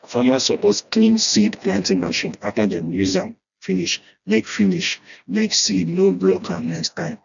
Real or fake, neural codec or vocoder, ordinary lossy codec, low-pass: fake; codec, 16 kHz, 1 kbps, FreqCodec, smaller model; none; 7.2 kHz